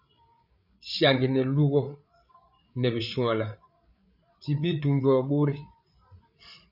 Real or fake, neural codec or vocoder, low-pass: fake; codec, 16 kHz, 8 kbps, FreqCodec, larger model; 5.4 kHz